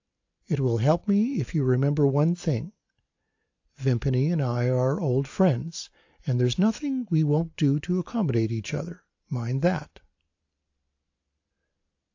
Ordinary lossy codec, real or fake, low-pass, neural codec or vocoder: AAC, 48 kbps; real; 7.2 kHz; none